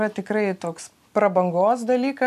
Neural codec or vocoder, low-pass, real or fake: none; 14.4 kHz; real